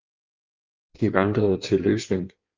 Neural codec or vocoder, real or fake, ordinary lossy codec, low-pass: codec, 16 kHz in and 24 kHz out, 1.1 kbps, FireRedTTS-2 codec; fake; Opus, 24 kbps; 7.2 kHz